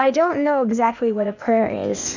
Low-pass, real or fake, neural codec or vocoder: 7.2 kHz; fake; codec, 16 kHz, 0.8 kbps, ZipCodec